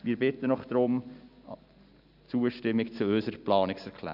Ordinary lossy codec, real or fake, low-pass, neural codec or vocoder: none; real; 5.4 kHz; none